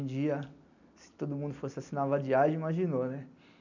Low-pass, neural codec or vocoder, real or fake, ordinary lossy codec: 7.2 kHz; none; real; none